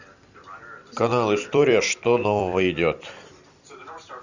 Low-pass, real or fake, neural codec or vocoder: 7.2 kHz; fake; vocoder, 44.1 kHz, 128 mel bands every 256 samples, BigVGAN v2